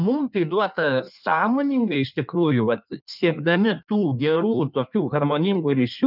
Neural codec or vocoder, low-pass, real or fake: codec, 16 kHz in and 24 kHz out, 1.1 kbps, FireRedTTS-2 codec; 5.4 kHz; fake